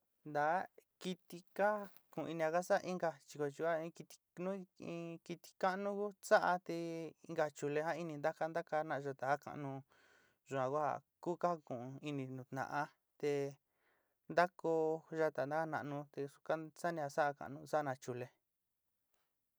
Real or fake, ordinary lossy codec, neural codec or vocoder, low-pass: real; none; none; none